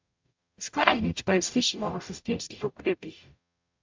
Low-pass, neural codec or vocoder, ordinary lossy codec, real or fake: 7.2 kHz; codec, 44.1 kHz, 0.9 kbps, DAC; MP3, 64 kbps; fake